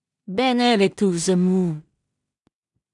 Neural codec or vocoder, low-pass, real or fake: codec, 16 kHz in and 24 kHz out, 0.4 kbps, LongCat-Audio-Codec, two codebook decoder; 10.8 kHz; fake